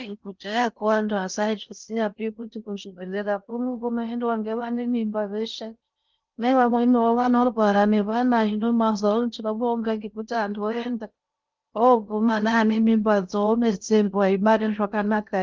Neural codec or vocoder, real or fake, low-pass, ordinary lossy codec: codec, 16 kHz in and 24 kHz out, 0.6 kbps, FocalCodec, streaming, 4096 codes; fake; 7.2 kHz; Opus, 24 kbps